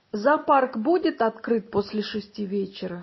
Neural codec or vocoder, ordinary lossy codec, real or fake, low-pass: none; MP3, 24 kbps; real; 7.2 kHz